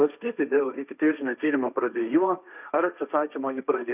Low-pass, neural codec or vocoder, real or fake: 3.6 kHz; codec, 16 kHz, 1.1 kbps, Voila-Tokenizer; fake